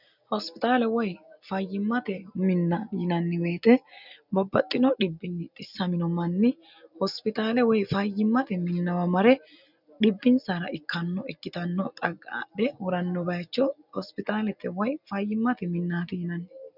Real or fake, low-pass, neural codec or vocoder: real; 5.4 kHz; none